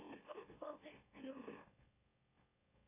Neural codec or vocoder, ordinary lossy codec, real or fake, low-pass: autoencoder, 44.1 kHz, a latent of 192 numbers a frame, MeloTTS; AAC, 32 kbps; fake; 3.6 kHz